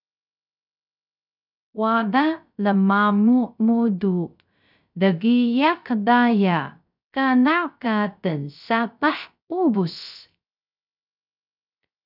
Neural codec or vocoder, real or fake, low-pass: codec, 16 kHz, 0.3 kbps, FocalCodec; fake; 5.4 kHz